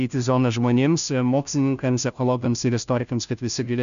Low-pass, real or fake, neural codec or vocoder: 7.2 kHz; fake; codec, 16 kHz, 0.5 kbps, FunCodec, trained on Chinese and English, 25 frames a second